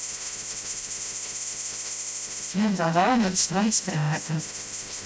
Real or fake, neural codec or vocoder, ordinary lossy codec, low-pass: fake; codec, 16 kHz, 0.5 kbps, FreqCodec, smaller model; none; none